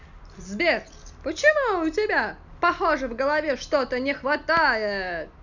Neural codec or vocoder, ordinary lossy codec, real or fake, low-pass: none; none; real; 7.2 kHz